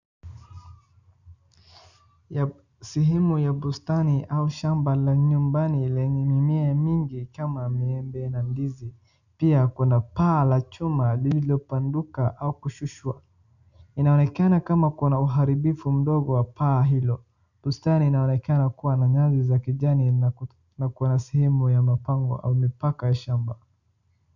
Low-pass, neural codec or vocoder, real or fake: 7.2 kHz; none; real